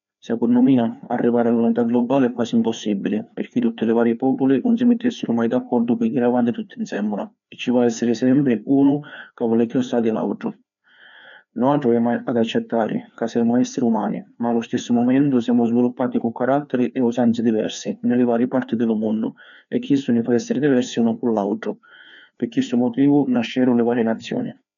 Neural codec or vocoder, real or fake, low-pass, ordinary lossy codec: codec, 16 kHz, 2 kbps, FreqCodec, larger model; fake; 7.2 kHz; none